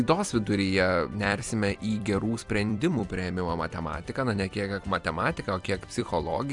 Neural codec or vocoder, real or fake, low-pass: vocoder, 44.1 kHz, 128 mel bands every 256 samples, BigVGAN v2; fake; 10.8 kHz